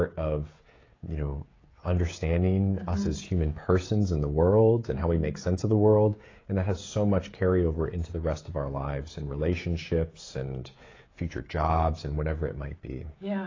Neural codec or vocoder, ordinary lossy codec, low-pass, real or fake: codec, 16 kHz, 16 kbps, FreqCodec, smaller model; AAC, 32 kbps; 7.2 kHz; fake